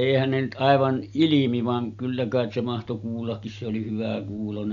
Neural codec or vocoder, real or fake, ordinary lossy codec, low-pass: none; real; none; 7.2 kHz